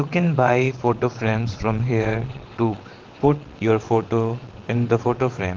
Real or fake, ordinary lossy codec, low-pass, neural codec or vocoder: fake; Opus, 16 kbps; 7.2 kHz; vocoder, 22.05 kHz, 80 mel bands, WaveNeXt